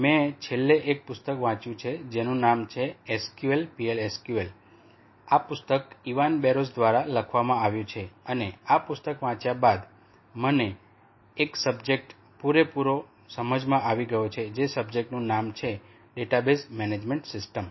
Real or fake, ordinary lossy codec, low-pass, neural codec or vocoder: real; MP3, 24 kbps; 7.2 kHz; none